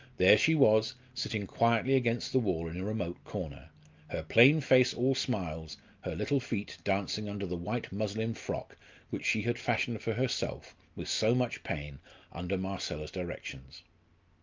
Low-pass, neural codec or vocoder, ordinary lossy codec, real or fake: 7.2 kHz; none; Opus, 24 kbps; real